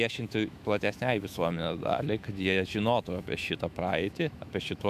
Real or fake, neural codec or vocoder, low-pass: fake; autoencoder, 48 kHz, 128 numbers a frame, DAC-VAE, trained on Japanese speech; 14.4 kHz